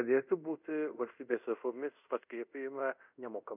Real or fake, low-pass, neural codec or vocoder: fake; 3.6 kHz; codec, 24 kHz, 0.5 kbps, DualCodec